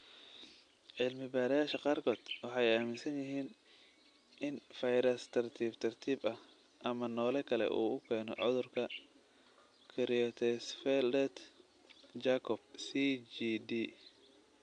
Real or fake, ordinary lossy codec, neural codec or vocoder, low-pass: real; AAC, 64 kbps; none; 9.9 kHz